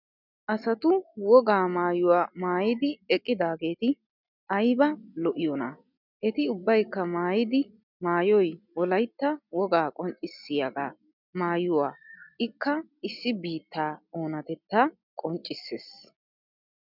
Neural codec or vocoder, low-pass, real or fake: none; 5.4 kHz; real